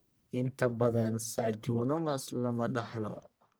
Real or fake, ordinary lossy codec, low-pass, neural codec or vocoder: fake; none; none; codec, 44.1 kHz, 1.7 kbps, Pupu-Codec